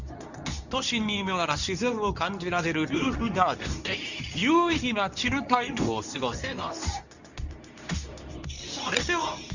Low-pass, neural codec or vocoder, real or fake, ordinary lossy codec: 7.2 kHz; codec, 24 kHz, 0.9 kbps, WavTokenizer, medium speech release version 2; fake; none